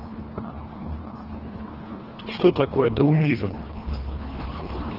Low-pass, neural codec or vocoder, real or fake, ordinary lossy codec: 5.4 kHz; codec, 24 kHz, 1.5 kbps, HILCodec; fake; Opus, 16 kbps